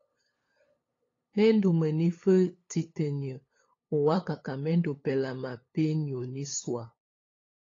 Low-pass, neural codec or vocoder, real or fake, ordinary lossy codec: 7.2 kHz; codec, 16 kHz, 8 kbps, FunCodec, trained on LibriTTS, 25 frames a second; fake; AAC, 32 kbps